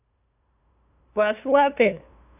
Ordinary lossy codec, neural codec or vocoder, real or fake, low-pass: none; codec, 16 kHz, 2 kbps, FunCodec, trained on LibriTTS, 25 frames a second; fake; 3.6 kHz